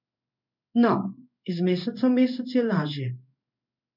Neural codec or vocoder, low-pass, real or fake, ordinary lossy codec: codec, 16 kHz in and 24 kHz out, 1 kbps, XY-Tokenizer; 5.4 kHz; fake; MP3, 48 kbps